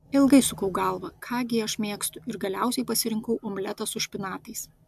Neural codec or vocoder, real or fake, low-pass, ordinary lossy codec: none; real; 14.4 kHz; AAC, 96 kbps